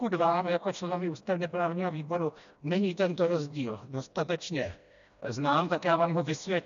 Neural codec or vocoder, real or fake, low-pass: codec, 16 kHz, 1 kbps, FreqCodec, smaller model; fake; 7.2 kHz